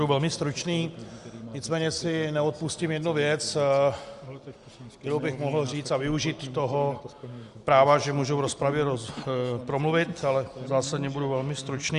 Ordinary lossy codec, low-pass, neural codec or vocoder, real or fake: Opus, 64 kbps; 10.8 kHz; none; real